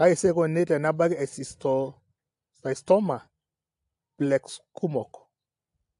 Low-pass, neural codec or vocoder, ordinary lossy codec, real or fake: 10.8 kHz; none; AAC, 64 kbps; real